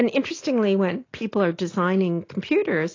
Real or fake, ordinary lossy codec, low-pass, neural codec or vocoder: real; AAC, 32 kbps; 7.2 kHz; none